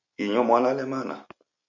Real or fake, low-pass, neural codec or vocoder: real; 7.2 kHz; none